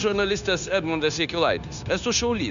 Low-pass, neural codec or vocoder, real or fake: 7.2 kHz; codec, 16 kHz, 0.9 kbps, LongCat-Audio-Codec; fake